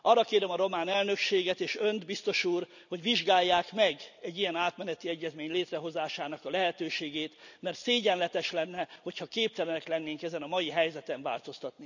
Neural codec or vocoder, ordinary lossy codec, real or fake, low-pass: none; none; real; 7.2 kHz